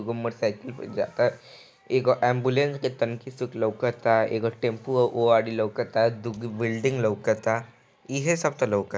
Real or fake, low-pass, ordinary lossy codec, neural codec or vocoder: real; none; none; none